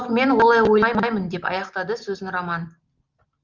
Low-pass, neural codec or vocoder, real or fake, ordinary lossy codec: 7.2 kHz; none; real; Opus, 32 kbps